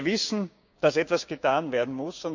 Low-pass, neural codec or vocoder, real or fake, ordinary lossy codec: 7.2 kHz; codec, 16 kHz, 6 kbps, DAC; fake; none